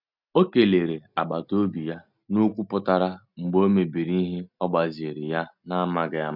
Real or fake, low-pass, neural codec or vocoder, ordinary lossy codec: real; 5.4 kHz; none; none